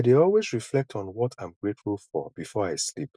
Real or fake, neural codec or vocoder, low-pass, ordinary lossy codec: real; none; none; none